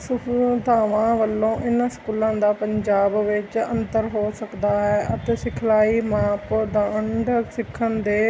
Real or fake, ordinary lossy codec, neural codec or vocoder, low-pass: real; none; none; none